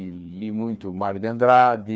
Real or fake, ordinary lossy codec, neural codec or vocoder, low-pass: fake; none; codec, 16 kHz, 2 kbps, FreqCodec, larger model; none